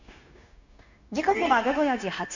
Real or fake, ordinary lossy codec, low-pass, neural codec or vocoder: fake; none; 7.2 kHz; codec, 16 kHz, 0.9 kbps, LongCat-Audio-Codec